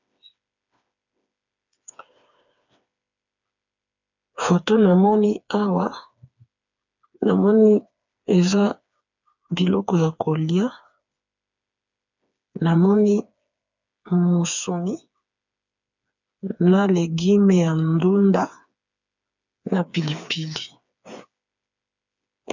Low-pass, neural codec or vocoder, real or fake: 7.2 kHz; codec, 16 kHz, 4 kbps, FreqCodec, smaller model; fake